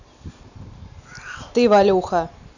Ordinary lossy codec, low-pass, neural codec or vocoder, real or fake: none; 7.2 kHz; none; real